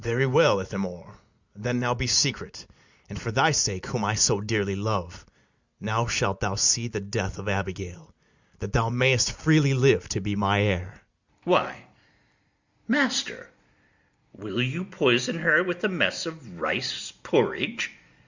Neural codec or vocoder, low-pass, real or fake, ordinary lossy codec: none; 7.2 kHz; real; Opus, 64 kbps